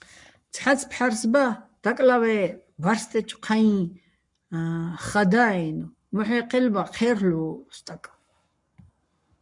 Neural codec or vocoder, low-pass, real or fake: codec, 44.1 kHz, 7.8 kbps, Pupu-Codec; 10.8 kHz; fake